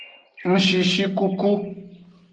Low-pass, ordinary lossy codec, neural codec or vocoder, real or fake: 9.9 kHz; Opus, 24 kbps; none; real